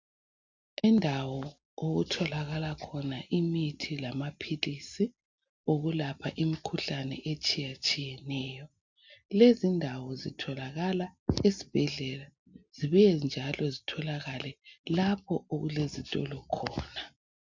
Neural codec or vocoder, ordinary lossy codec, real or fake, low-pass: none; MP3, 64 kbps; real; 7.2 kHz